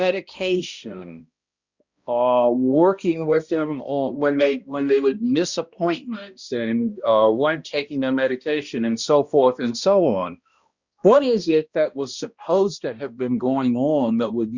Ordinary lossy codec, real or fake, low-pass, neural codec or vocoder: Opus, 64 kbps; fake; 7.2 kHz; codec, 16 kHz, 1 kbps, X-Codec, HuBERT features, trained on balanced general audio